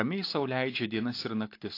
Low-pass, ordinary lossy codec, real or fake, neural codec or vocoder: 5.4 kHz; AAC, 32 kbps; real; none